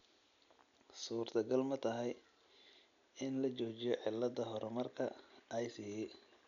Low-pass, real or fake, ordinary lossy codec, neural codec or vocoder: 7.2 kHz; real; none; none